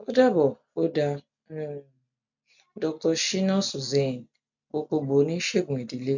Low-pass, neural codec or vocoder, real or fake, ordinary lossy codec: 7.2 kHz; none; real; none